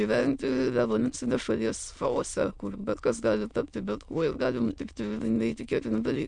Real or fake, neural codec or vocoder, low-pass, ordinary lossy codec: fake; autoencoder, 22.05 kHz, a latent of 192 numbers a frame, VITS, trained on many speakers; 9.9 kHz; MP3, 64 kbps